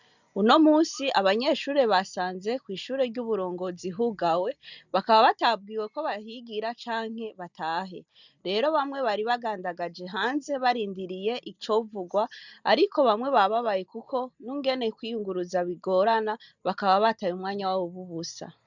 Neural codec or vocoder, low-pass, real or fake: none; 7.2 kHz; real